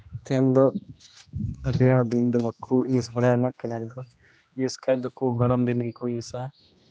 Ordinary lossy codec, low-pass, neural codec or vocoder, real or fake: none; none; codec, 16 kHz, 1 kbps, X-Codec, HuBERT features, trained on general audio; fake